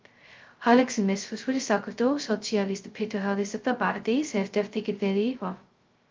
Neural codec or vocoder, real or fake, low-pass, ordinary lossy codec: codec, 16 kHz, 0.2 kbps, FocalCodec; fake; 7.2 kHz; Opus, 24 kbps